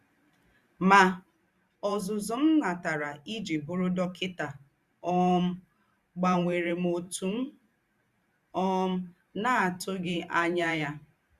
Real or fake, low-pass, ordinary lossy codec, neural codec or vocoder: fake; 14.4 kHz; Opus, 64 kbps; vocoder, 44.1 kHz, 128 mel bands every 512 samples, BigVGAN v2